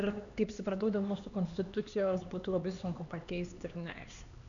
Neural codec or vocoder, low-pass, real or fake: codec, 16 kHz, 2 kbps, X-Codec, HuBERT features, trained on LibriSpeech; 7.2 kHz; fake